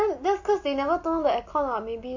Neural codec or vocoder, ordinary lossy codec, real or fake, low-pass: none; AAC, 48 kbps; real; 7.2 kHz